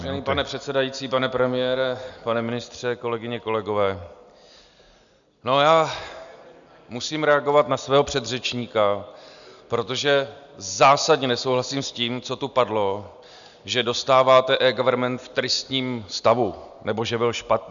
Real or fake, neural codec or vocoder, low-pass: real; none; 7.2 kHz